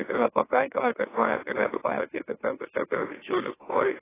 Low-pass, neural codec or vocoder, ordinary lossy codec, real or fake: 3.6 kHz; autoencoder, 44.1 kHz, a latent of 192 numbers a frame, MeloTTS; AAC, 16 kbps; fake